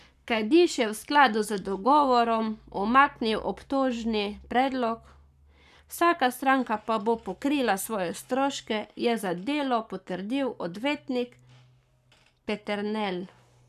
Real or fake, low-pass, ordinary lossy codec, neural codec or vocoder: fake; 14.4 kHz; none; codec, 44.1 kHz, 7.8 kbps, Pupu-Codec